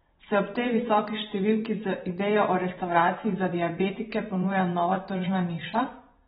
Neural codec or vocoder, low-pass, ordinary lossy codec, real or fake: vocoder, 44.1 kHz, 128 mel bands every 512 samples, BigVGAN v2; 19.8 kHz; AAC, 16 kbps; fake